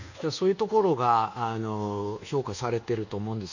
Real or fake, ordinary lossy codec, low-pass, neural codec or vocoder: fake; none; 7.2 kHz; codec, 24 kHz, 1.2 kbps, DualCodec